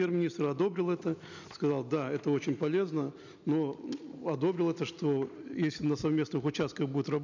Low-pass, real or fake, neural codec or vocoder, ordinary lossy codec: 7.2 kHz; real; none; none